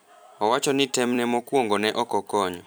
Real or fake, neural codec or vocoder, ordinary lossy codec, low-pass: fake; vocoder, 44.1 kHz, 128 mel bands every 256 samples, BigVGAN v2; none; none